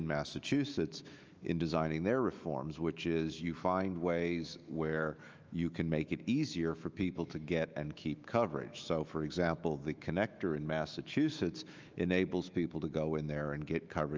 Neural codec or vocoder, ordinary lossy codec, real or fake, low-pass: autoencoder, 48 kHz, 128 numbers a frame, DAC-VAE, trained on Japanese speech; Opus, 24 kbps; fake; 7.2 kHz